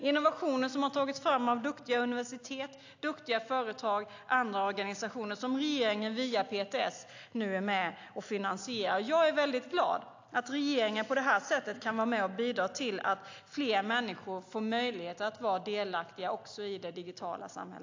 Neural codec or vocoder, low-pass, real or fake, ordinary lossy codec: none; 7.2 kHz; real; AAC, 48 kbps